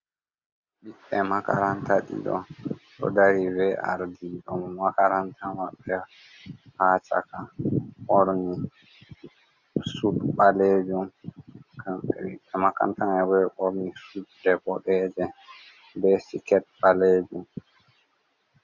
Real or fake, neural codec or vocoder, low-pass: real; none; 7.2 kHz